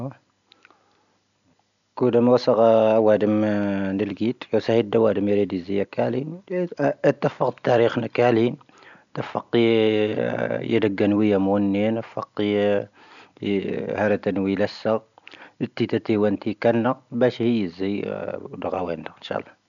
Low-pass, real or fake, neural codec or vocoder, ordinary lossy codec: 7.2 kHz; real; none; none